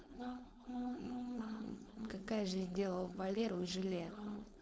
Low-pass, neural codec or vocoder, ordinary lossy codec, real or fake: none; codec, 16 kHz, 4.8 kbps, FACodec; none; fake